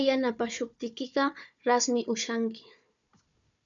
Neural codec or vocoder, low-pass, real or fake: codec, 16 kHz, 4 kbps, FunCodec, trained on Chinese and English, 50 frames a second; 7.2 kHz; fake